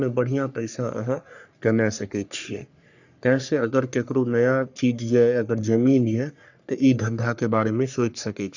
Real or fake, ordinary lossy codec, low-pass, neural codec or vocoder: fake; none; 7.2 kHz; codec, 44.1 kHz, 3.4 kbps, Pupu-Codec